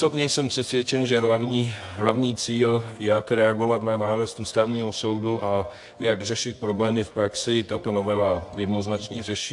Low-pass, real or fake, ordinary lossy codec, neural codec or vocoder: 10.8 kHz; fake; AAC, 64 kbps; codec, 24 kHz, 0.9 kbps, WavTokenizer, medium music audio release